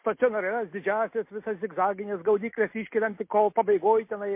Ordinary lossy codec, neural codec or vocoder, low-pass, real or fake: MP3, 24 kbps; none; 3.6 kHz; real